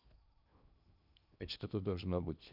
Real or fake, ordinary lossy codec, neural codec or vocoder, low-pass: fake; none; codec, 16 kHz in and 24 kHz out, 0.8 kbps, FocalCodec, streaming, 65536 codes; 5.4 kHz